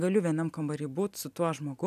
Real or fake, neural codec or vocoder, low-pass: real; none; 14.4 kHz